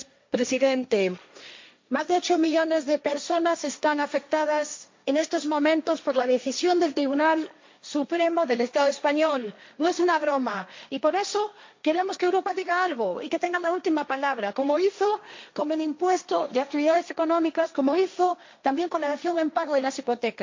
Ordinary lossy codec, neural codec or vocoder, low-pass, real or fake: MP3, 48 kbps; codec, 16 kHz, 1.1 kbps, Voila-Tokenizer; 7.2 kHz; fake